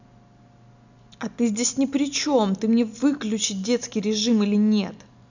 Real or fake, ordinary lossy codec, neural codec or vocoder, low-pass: real; none; none; 7.2 kHz